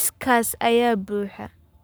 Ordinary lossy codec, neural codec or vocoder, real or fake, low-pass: none; codec, 44.1 kHz, 7.8 kbps, Pupu-Codec; fake; none